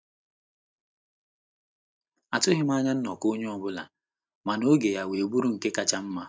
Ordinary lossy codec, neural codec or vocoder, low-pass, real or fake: none; none; none; real